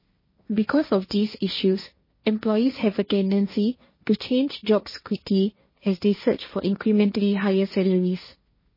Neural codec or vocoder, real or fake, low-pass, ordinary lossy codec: codec, 16 kHz, 1.1 kbps, Voila-Tokenizer; fake; 5.4 kHz; MP3, 24 kbps